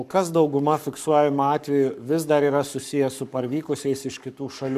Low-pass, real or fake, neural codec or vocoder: 14.4 kHz; fake; codec, 44.1 kHz, 7.8 kbps, Pupu-Codec